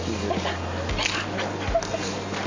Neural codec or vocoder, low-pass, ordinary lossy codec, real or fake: none; 7.2 kHz; MP3, 48 kbps; real